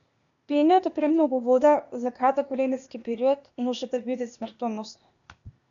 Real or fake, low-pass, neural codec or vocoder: fake; 7.2 kHz; codec, 16 kHz, 0.8 kbps, ZipCodec